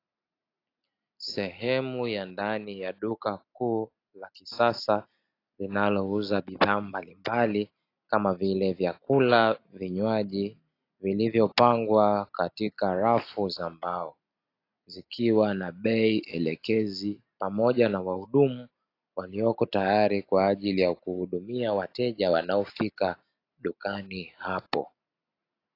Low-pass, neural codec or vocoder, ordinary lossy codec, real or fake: 5.4 kHz; none; AAC, 32 kbps; real